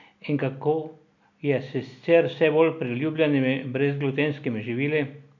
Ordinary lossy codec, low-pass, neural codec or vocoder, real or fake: none; 7.2 kHz; none; real